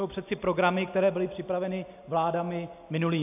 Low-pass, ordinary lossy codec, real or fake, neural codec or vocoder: 3.6 kHz; AAC, 32 kbps; real; none